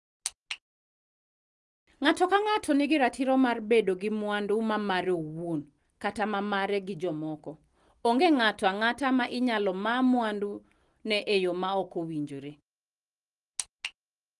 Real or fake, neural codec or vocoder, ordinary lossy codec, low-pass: real; none; Opus, 24 kbps; 10.8 kHz